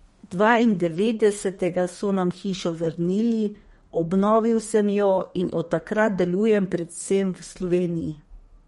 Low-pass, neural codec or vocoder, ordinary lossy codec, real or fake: 14.4 kHz; codec, 32 kHz, 1.9 kbps, SNAC; MP3, 48 kbps; fake